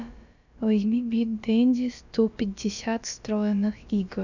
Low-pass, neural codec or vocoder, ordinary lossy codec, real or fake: 7.2 kHz; codec, 16 kHz, about 1 kbps, DyCAST, with the encoder's durations; none; fake